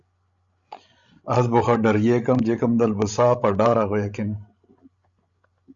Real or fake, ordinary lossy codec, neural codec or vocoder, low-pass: fake; Opus, 64 kbps; codec, 16 kHz, 16 kbps, FreqCodec, larger model; 7.2 kHz